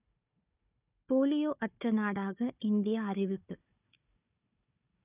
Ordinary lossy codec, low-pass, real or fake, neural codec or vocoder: none; 3.6 kHz; fake; codec, 16 kHz, 4 kbps, FunCodec, trained on Chinese and English, 50 frames a second